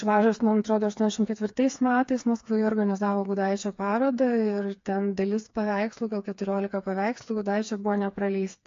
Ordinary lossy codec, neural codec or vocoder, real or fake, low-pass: AAC, 48 kbps; codec, 16 kHz, 4 kbps, FreqCodec, smaller model; fake; 7.2 kHz